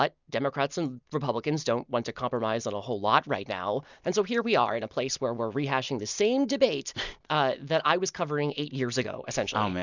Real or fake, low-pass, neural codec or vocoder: real; 7.2 kHz; none